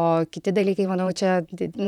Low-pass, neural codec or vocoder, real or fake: 19.8 kHz; vocoder, 44.1 kHz, 128 mel bands, Pupu-Vocoder; fake